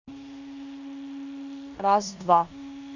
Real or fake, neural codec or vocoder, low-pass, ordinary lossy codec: fake; codec, 16 kHz in and 24 kHz out, 0.9 kbps, LongCat-Audio-Codec, four codebook decoder; 7.2 kHz; none